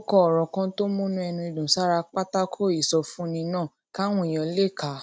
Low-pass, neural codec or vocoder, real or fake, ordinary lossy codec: none; none; real; none